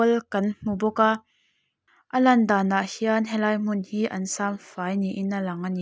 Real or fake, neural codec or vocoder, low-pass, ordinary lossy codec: real; none; none; none